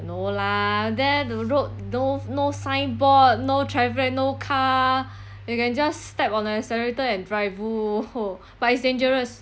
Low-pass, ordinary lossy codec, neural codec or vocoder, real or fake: none; none; none; real